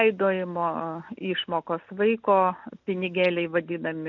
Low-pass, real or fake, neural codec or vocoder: 7.2 kHz; real; none